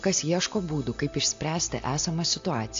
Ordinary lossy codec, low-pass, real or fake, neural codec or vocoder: AAC, 48 kbps; 7.2 kHz; real; none